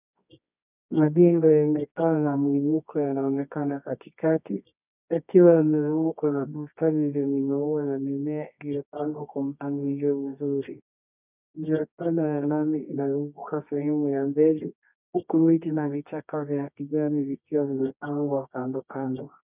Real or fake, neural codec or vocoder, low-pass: fake; codec, 24 kHz, 0.9 kbps, WavTokenizer, medium music audio release; 3.6 kHz